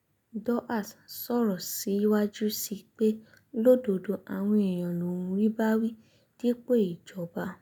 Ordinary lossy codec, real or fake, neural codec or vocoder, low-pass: none; real; none; none